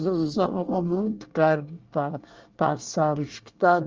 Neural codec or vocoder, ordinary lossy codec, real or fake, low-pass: codec, 24 kHz, 1 kbps, SNAC; Opus, 24 kbps; fake; 7.2 kHz